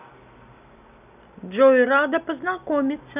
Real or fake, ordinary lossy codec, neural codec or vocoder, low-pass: real; none; none; 3.6 kHz